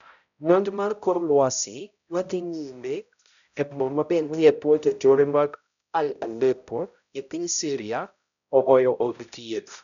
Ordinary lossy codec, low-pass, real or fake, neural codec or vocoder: none; 7.2 kHz; fake; codec, 16 kHz, 0.5 kbps, X-Codec, HuBERT features, trained on balanced general audio